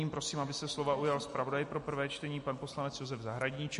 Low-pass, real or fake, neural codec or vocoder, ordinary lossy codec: 14.4 kHz; real; none; MP3, 48 kbps